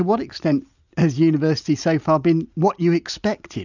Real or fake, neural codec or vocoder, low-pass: real; none; 7.2 kHz